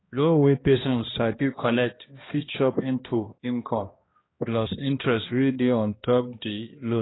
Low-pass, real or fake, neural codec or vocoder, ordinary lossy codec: 7.2 kHz; fake; codec, 16 kHz, 1 kbps, X-Codec, HuBERT features, trained on balanced general audio; AAC, 16 kbps